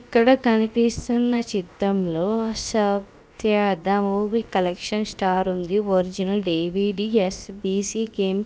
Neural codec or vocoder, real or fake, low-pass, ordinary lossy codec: codec, 16 kHz, about 1 kbps, DyCAST, with the encoder's durations; fake; none; none